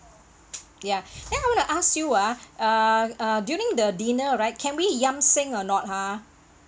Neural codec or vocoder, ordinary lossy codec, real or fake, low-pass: none; none; real; none